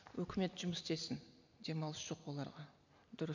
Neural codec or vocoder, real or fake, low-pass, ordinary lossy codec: none; real; 7.2 kHz; none